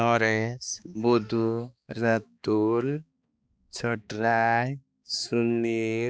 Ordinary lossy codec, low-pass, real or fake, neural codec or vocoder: none; none; fake; codec, 16 kHz, 2 kbps, X-Codec, HuBERT features, trained on balanced general audio